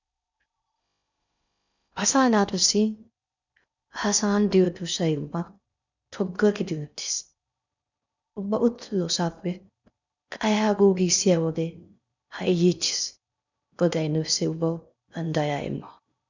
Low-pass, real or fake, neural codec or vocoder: 7.2 kHz; fake; codec, 16 kHz in and 24 kHz out, 0.6 kbps, FocalCodec, streaming, 4096 codes